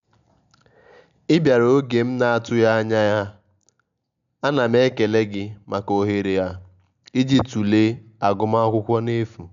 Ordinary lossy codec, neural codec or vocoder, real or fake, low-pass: none; none; real; 7.2 kHz